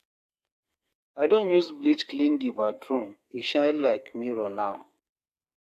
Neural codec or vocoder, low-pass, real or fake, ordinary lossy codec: codec, 32 kHz, 1.9 kbps, SNAC; 14.4 kHz; fake; AAC, 64 kbps